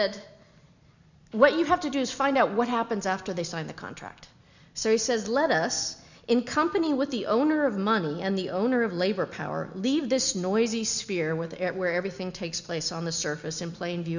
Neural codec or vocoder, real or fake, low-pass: none; real; 7.2 kHz